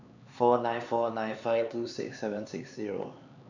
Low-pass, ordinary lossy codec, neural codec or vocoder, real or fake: 7.2 kHz; none; codec, 16 kHz, 4 kbps, X-Codec, HuBERT features, trained on LibriSpeech; fake